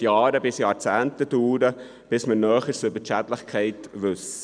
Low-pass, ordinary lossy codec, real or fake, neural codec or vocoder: 9.9 kHz; none; real; none